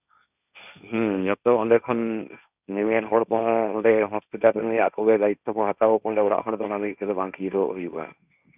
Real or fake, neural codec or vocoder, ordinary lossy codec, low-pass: fake; codec, 16 kHz, 1.1 kbps, Voila-Tokenizer; none; 3.6 kHz